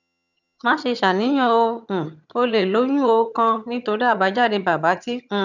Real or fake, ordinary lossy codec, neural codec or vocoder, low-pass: fake; none; vocoder, 22.05 kHz, 80 mel bands, HiFi-GAN; 7.2 kHz